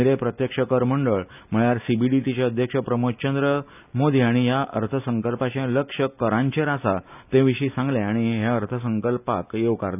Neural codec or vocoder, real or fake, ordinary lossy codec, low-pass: none; real; none; 3.6 kHz